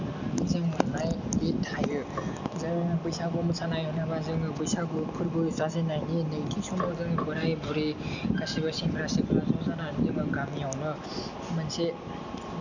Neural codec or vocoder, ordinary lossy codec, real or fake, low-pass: none; none; real; 7.2 kHz